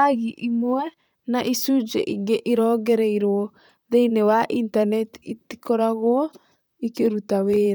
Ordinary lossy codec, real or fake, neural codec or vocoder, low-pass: none; fake; vocoder, 44.1 kHz, 128 mel bands, Pupu-Vocoder; none